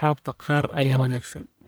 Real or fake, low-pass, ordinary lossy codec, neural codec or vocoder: fake; none; none; codec, 44.1 kHz, 1.7 kbps, Pupu-Codec